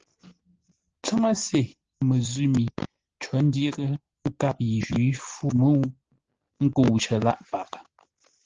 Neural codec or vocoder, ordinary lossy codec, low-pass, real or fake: none; Opus, 16 kbps; 7.2 kHz; real